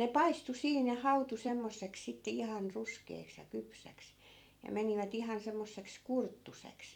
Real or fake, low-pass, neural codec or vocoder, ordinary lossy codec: fake; 19.8 kHz; vocoder, 44.1 kHz, 128 mel bands every 256 samples, BigVGAN v2; none